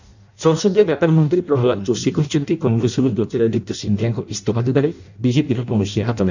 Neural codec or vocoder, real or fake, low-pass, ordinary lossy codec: codec, 16 kHz in and 24 kHz out, 0.6 kbps, FireRedTTS-2 codec; fake; 7.2 kHz; none